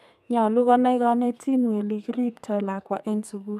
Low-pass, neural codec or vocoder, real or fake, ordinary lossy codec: 14.4 kHz; codec, 32 kHz, 1.9 kbps, SNAC; fake; none